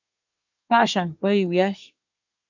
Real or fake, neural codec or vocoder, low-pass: fake; codec, 24 kHz, 1 kbps, SNAC; 7.2 kHz